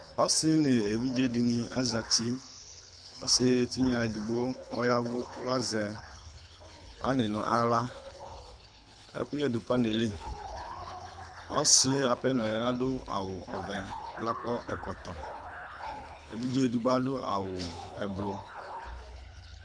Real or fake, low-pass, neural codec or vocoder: fake; 9.9 kHz; codec, 24 kHz, 3 kbps, HILCodec